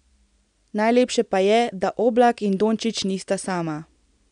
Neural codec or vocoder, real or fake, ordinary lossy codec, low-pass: none; real; none; 9.9 kHz